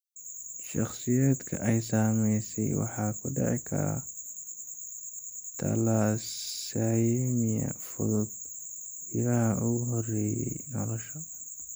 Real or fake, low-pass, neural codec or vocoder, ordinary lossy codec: real; none; none; none